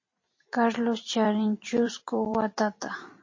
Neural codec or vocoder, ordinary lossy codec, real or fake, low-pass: vocoder, 44.1 kHz, 128 mel bands every 256 samples, BigVGAN v2; MP3, 32 kbps; fake; 7.2 kHz